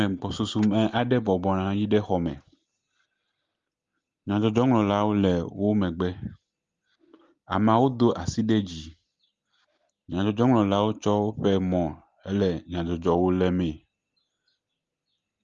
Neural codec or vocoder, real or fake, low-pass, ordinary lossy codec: none; real; 7.2 kHz; Opus, 32 kbps